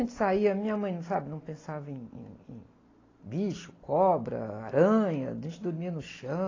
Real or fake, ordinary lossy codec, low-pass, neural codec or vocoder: real; AAC, 32 kbps; 7.2 kHz; none